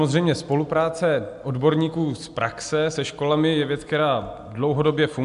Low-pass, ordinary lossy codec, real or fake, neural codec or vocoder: 10.8 kHz; AAC, 96 kbps; real; none